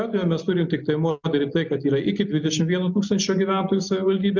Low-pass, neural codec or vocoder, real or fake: 7.2 kHz; none; real